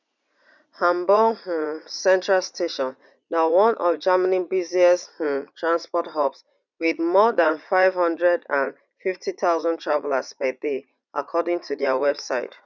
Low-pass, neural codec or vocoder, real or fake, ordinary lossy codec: 7.2 kHz; vocoder, 44.1 kHz, 80 mel bands, Vocos; fake; none